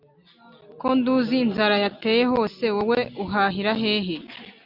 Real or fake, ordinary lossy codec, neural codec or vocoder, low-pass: real; MP3, 48 kbps; none; 5.4 kHz